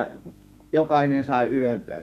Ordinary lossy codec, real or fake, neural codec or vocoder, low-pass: none; fake; codec, 32 kHz, 1.9 kbps, SNAC; 14.4 kHz